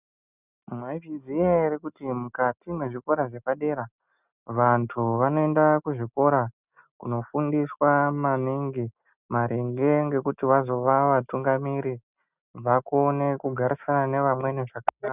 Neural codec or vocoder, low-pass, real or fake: none; 3.6 kHz; real